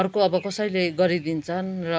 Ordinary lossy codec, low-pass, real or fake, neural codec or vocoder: none; none; real; none